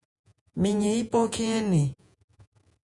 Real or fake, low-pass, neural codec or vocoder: fake; 10.8 kHz; vocoder, 48 kHz, 128 mel bands, Vocos